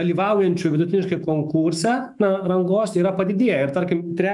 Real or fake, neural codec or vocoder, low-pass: fake; autoencoder, 48 kHz, 128 numbers a frame, DAC-VAE, trained on Japanese speech; 10.8 kHz